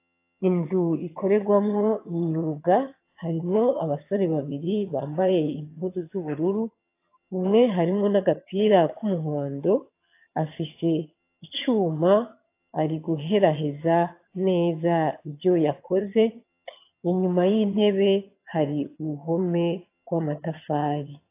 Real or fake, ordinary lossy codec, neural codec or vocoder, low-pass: fake; AAC, 24 kbps; vocoder, 22.05 kHz, 80 mel bands, HiFi-GAN; 3.6 kHz